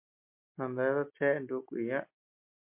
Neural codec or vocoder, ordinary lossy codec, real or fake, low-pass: none; MP3, 32 kbps; real; 3.6 kHz